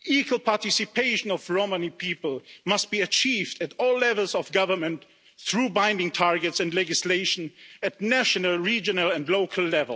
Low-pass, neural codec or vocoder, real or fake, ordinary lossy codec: none; none; real; none